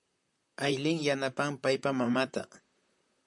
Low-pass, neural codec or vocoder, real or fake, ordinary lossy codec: 10.8 kHz; vocoder, 44.1 kHz, 128 mel bands, Pupu-Vocoder; fake; MP3, 64 kbps